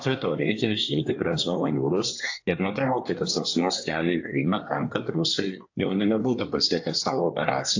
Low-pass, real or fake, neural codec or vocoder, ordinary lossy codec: 7.2 kHz; fake; codec, 24 kHz, 1 kbps, SNAC; AAC, 48 kbps